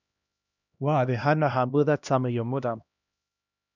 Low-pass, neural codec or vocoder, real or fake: 7.2 kHz; codec, 16 kHz, 1 kbps, X-Codec, HuBERT features, trained on LibriSpeech; fake